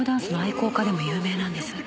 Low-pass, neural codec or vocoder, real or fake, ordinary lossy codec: none; none; real; none